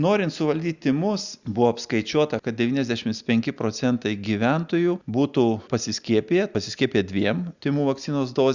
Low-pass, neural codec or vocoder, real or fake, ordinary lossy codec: 7.2 kHz; none; real; Opus, 64 kbps